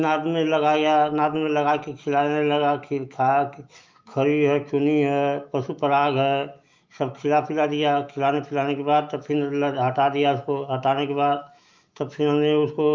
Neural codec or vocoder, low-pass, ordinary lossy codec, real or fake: autoencoder, 48 kHz, 128 numbers a frame, DAC-VAE, trained on Japanese speech; 7.2 kHz; Opus, 24 kbps; fake